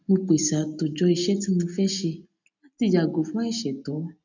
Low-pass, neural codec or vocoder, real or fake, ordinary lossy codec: 7.2 kHz; none; real; none